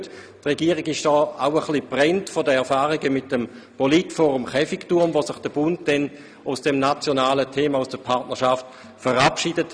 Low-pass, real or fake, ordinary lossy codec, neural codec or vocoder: none; real; none; none